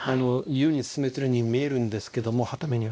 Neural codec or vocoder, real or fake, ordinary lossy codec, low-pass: codec, 16 kHz, 1 kbps, X-Codec, WavLM features, trained on Multilingual LibriSpeech; fake; none; none